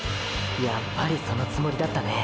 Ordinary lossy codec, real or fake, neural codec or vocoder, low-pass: none; real; none; none